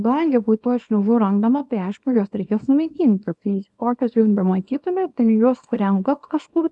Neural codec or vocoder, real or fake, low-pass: codec, 24 kHz, 0.9 kbps, WavTokenizer, small release; fake; 10.8 kHz